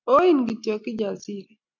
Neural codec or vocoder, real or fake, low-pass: none; real; 7.2 kHz